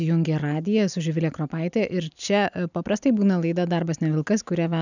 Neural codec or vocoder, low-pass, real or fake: none; 7.2 kHz; real